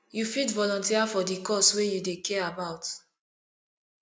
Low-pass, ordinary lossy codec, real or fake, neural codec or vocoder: none; none; real; none